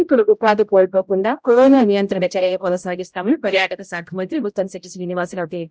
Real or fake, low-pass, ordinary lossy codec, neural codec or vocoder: fake; none; none; codec, 16 kHz, 0.5 kbps, X-Codec, HuBERT features, trained on general audio